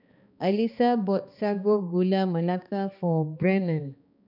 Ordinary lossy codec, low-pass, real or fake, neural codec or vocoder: none; 5.4 kHz; fake; codec, 16 kHz, 2 kbps, X-Codec, HuBERT features, trained on balanced general audio